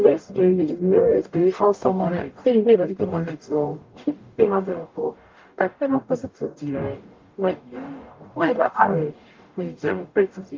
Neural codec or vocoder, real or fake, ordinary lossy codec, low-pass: codec, 44.1 kHz, 0.9 kbps, DAC; fake; Opus, 32 kbps; 7.2 kHz